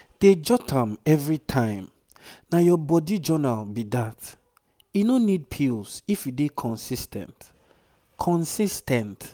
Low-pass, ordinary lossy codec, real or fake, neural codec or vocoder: none; none; real; none